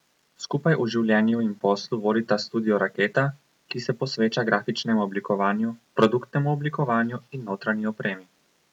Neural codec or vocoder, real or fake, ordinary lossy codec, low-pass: none; real; none; 19.8 kHz